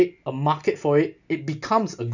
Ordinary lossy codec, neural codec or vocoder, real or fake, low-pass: none; none; real; 7.2 kHz